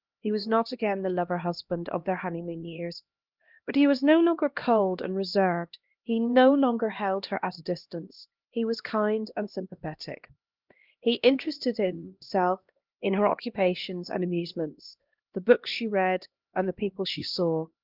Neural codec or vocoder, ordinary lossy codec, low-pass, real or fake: codec, 16 kHz, 1 kbps, X-Codec, HuBERT features, trained on LibriSpeech; Opus, 24 kbps; 5.4 kHz; fake